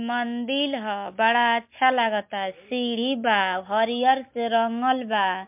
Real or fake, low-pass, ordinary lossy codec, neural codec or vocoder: real; 3.6 kHz; MP3, 32 kbps; none